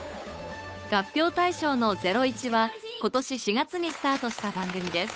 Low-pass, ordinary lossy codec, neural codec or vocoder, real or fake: none; none; codec, 16 kHz, 8 kbps, FunCodec, trained on Chinese and English, 25 frames a second; fake